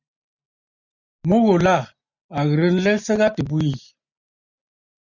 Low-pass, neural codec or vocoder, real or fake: 7.2 kHz; none; real